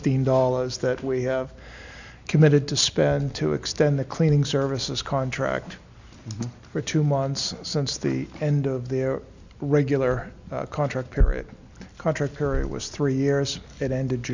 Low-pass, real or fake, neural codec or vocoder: 7.2 kHz; real; none